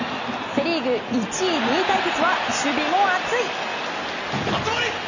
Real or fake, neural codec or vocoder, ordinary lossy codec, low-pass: real; none; none; 7.2 kHz